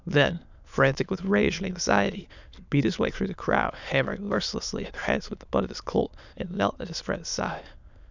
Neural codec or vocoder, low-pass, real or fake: autoencoder, 22.05 kHz, a latent of 192 numbers a frame, VITS, trained on many speakers; 7.2 kHz; fake